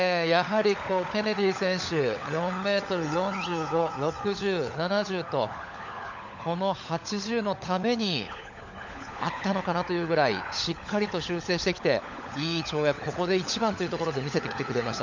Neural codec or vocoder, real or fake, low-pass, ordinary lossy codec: codec, 16 kHz, 16 kbps, FunCodec, trained on LibriTTS, 50 frames a second; fake; 7.2 kHz; none